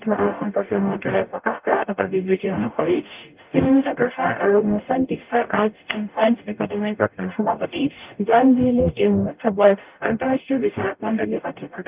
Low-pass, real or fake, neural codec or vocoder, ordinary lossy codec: 3.6 kHz; fake; codec, 44.1 kHz, 0.9 kbps, DAC; Opus, 24 kbps